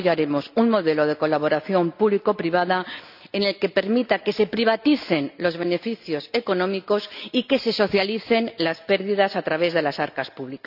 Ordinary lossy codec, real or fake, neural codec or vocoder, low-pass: none; real; none; 5.4 kHz